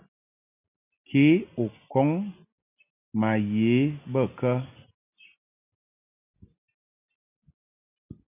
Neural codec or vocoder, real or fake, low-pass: none; real; 3.6 kHz